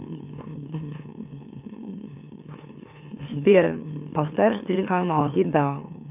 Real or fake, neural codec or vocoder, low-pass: fake; autoencoder, 44.1 kHz, a latent of 192 numbers a frame, MeloTTS; 3.6 kHz